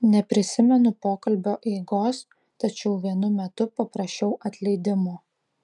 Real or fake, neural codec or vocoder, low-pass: real; none; 10.8 kHz